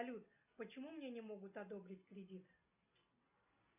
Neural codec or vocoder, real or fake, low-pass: none; real; 3.6 kHz